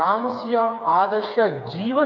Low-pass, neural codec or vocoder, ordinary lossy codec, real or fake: 7.2 kHz; codec, 16 kHz, 4 kbps, FreqCodec, smaller model; MP3, 48 kbps; fake